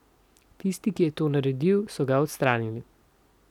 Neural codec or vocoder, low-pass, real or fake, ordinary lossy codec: none; 19.8 kHz; real; none